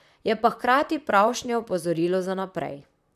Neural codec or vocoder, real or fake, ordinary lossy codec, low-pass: vocoder, 44.1 kHz, 128 mel bands, Pupu-Vocoder; fake; none; 14.4 kHz